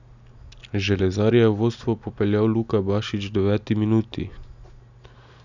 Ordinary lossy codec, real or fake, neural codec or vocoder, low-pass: none; real; none; 7.2 kHz